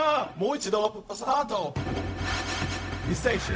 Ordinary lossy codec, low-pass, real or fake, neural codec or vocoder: none; none; fake; codec, 16 kHz, 0.4 kbps, LongCat-Audio-Codec